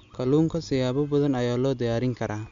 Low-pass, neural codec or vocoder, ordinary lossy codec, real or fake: 7.2 kHz; none; MP3, 64 kbps; real